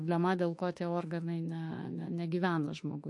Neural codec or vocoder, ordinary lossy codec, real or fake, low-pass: autoencoder, 48 kHz, 32 numbers a frame, DAC-VAE, trained on Japanese speech; MP3, 48 kbps; fake; 10.8 kHz